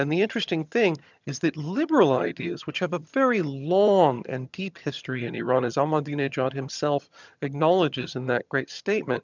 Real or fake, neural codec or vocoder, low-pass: fake; vocoder, 22.05 kHz, 80 mel bands, HiFi-GAN; 7.2 kHz